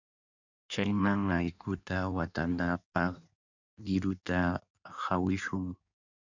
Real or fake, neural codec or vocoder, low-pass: fake; codec, 16 kHz in and 24 kHz out, 1.1 kbps, FireRedTTS-2 codec; 7.2 kHz